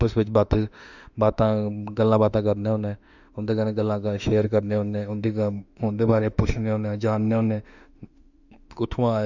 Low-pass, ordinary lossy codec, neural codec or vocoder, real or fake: 7.2 kHz; none; autoencoder, 48 kHz, 32 numbers a frame, DAC-VAE, trained on Japanese speech; fake